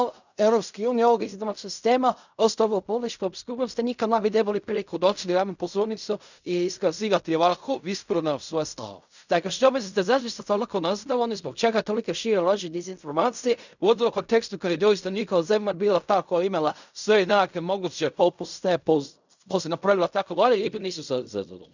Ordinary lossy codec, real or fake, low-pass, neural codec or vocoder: none; fake; 7.2 kHz; codec, 16 kHz in and 24 kHz out, 0.4 kbps, LongCat-Audio-Codec, fine tuned four codebook decoder